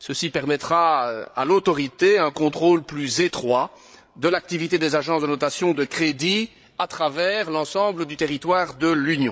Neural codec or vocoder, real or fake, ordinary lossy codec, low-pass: codec, 16 kHz, 8 kbps, FreqCodec, larger model; fake; none; none